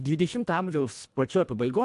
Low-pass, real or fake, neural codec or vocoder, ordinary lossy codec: 10.8 kHz; fake; codec, 24 kHz, 1.5 kbps, HILCodec; AAC, 64 kbps